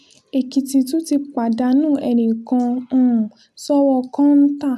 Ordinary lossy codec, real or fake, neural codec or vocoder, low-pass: none; real; none; 14.4 kHz